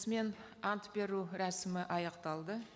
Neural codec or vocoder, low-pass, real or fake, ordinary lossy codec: none; none; real; none